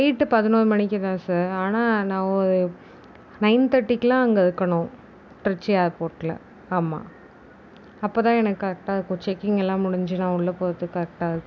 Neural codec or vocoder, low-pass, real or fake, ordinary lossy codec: none; none; real; none